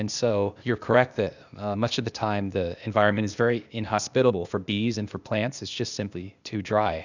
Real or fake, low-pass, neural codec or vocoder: fake; 7.2 kHz; codec, 16 kHz, 0.8 kbps, ZipCodec